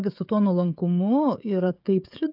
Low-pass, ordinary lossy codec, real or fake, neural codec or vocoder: 5.4 kHz; AAC, 48 kbps; fake; codec, 16 kHz, 16 kbps, FreqCodec, smaller model